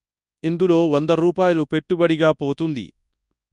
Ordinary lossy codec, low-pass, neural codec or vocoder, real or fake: none; 10.8 kHz; codec, 24 kHz, 0.9 kbps, WavTokenizer, large speech release; fake